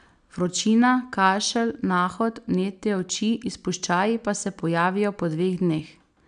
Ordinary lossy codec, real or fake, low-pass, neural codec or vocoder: none; real; 9.9 kHz; none